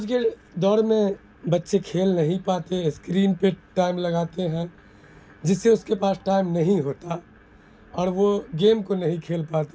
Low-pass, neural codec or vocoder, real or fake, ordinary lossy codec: none; none; real; none